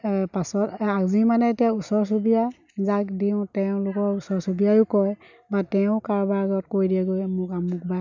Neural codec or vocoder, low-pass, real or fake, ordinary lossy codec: none; 7.2 kHz; real; none